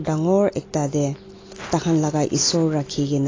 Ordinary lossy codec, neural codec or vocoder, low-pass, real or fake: AAC, 32 kbps; none; 7.2 kHz; real